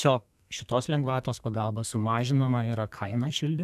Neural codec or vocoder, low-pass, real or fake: codec, 32 kHz, 1.9 kbps, SNAC; 14.4 kHz; fake